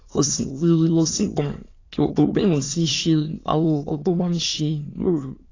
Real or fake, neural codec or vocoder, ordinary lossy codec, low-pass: fake; autoencoder, 22.05 kHz, a latent of 192 numbers a frame, VITS, trained on many speakers; AAC, 32 kbps; 7.2 kHz